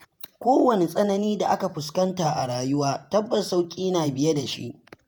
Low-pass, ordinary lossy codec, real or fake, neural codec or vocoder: none; none; fake; vocoder, 48 kHz, 128 mel bands, Vocos